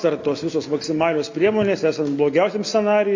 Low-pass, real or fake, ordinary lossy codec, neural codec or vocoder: 7.2 kHz; real; MP3, 64 kbps; none